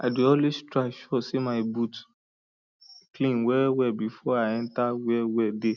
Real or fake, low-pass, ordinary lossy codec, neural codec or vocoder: real; 7.2 kHz; none; none